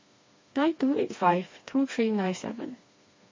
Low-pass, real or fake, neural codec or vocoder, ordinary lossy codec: 7.2 kHz; fake; codec, 16 kHz, 2 kbps, FreqCodec, smaller model; MP3, 32 kbps